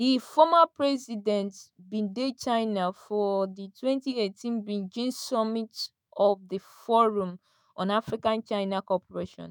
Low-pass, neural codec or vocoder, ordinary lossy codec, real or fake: none; autoencoder, 48 kHz, 128 numbers a frame, DAC-VAE, trained on Japanese speech; none; fake